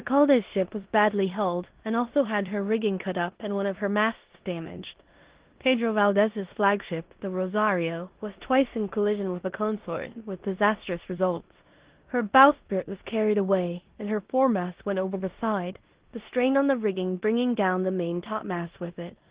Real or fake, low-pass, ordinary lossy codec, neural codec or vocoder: fake; 3.6 kHz; Opus, 16 kbps; codec, 16 kHz in and 24 kHz out, 0.9 kbps, LongCat-Audio-Codec, four codebook decoder